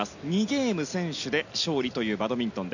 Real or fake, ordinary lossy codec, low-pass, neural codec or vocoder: real; none; 7.2 kHz; none